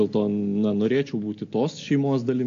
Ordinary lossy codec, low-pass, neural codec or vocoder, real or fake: AAC, 48 kbps; 7.2 kHz; none; real